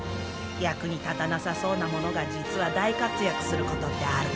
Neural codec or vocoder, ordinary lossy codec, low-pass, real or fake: none; none; none; real